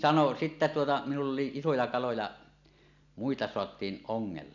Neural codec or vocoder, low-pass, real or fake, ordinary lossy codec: none; 7.2 kHz; real; none